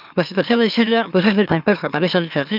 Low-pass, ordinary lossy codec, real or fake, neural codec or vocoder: 5.4 kHz; none; fake; autoencoder, 44.1 kHz, a latent of 192 numbers a frame, MeloTTS